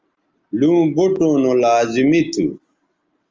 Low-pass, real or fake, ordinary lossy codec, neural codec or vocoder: 7.2 kHz; real; Opus, 32 kbps; none